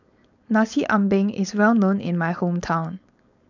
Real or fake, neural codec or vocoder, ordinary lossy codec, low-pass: fake; codec, 16 kHz, 4.8 kbps, FACodec; none; 7.2 kHz